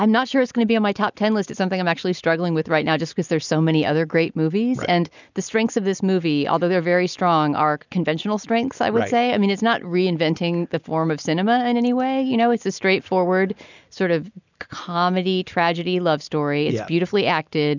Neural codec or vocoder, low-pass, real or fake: none; 7.2 kHz; real